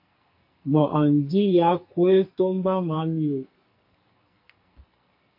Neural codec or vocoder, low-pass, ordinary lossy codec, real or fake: codec, 44.1 kHz, 2.6 kbps, SNAC; 5.4 kHz; MP3, 32 kbps; fake